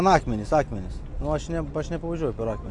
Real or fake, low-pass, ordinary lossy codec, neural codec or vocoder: real; 10.8 kHz; AAC, 64 kbps; none